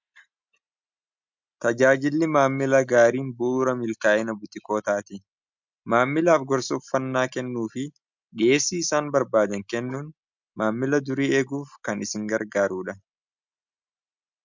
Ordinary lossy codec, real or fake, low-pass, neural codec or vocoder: MP3, 64 kbps; real; 7.2 kHz; none